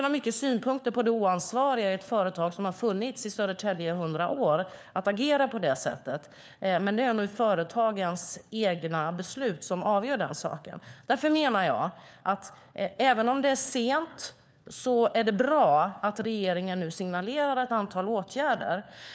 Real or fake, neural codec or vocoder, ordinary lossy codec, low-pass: fake; codec, 16 kHz, 4 kbps, FunCodec, trained on LibriTTS, 50 frames a second; none; none